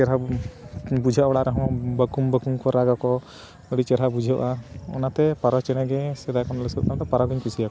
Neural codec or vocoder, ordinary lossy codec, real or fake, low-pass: none; none; real; none